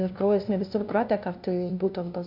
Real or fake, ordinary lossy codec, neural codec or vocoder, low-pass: fake; MP3, 48 kbps; codec, 16 kHz, 0.5 kbps, FunCodec, trained on LibriTTS, 25 frames a second; 5.4 kHz